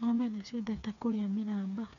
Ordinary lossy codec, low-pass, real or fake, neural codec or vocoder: none; 7.2 kHz; fake; codec, 16 kHz, 4 kbps, FreqCodec, smaller model